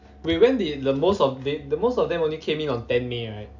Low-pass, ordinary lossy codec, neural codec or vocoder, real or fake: 7.2 kHz; none; none; real